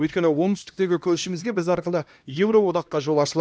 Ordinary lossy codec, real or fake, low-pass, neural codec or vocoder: none; fake; none; codec, 16 kHz, 1 kbps, X-Codec, HuBERT features, trained on LibriSpeech